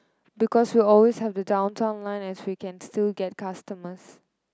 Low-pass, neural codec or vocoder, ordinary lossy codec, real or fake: none; none; none; real